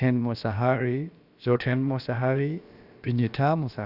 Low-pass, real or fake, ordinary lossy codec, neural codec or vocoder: 5.4 kHz; fake; Opus, 64 kbps; codec, 16 kHz, about 1 kbps, DyCAST, with the encoder's durations